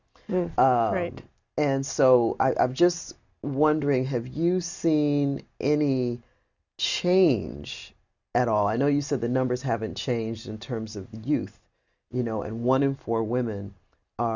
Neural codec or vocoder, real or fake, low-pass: none; real; 7.2 kHz